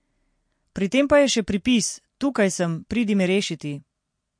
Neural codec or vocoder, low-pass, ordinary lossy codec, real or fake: none; 9.9 kHz; MP3, 48 kbps; real